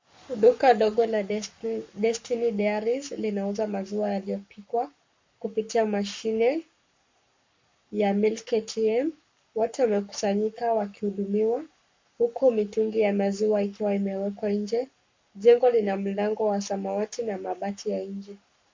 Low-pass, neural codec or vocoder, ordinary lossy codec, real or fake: 7.2 kHz; codec, 44.1 kHz, 7.8 kbps, Pupu-Codec; MP3, 48 kbps; fake